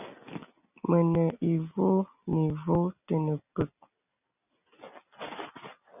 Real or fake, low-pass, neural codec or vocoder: real; 3.6 kHz; none